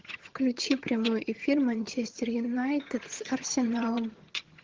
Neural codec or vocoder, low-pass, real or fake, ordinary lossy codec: vocoder, 22.05 kHz, 80 mel bands, HiFi-GAN; 7.2 kHz; fake; Opus, 16 kbps